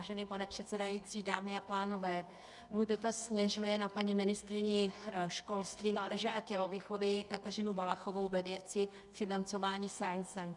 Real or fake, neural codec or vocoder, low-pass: fake; codec, 24 kHz, 0.9 kbps, WavTokenizer, medium music audio release; 10.8 kHz